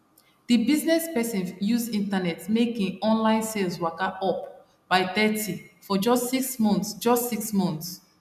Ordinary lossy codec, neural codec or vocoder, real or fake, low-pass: none; none; real; 14.4 kHz